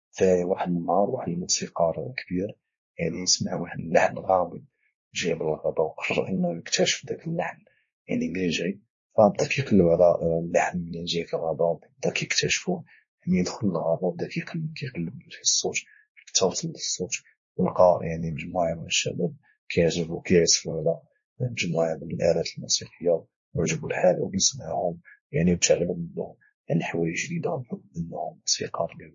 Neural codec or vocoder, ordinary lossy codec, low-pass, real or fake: codec, 16 kHz, 2 kbps, X-Codec, WavLM features, trained on Multilingual LibriSpeech; MP3, 32 kbps; 7.2 kHz; fake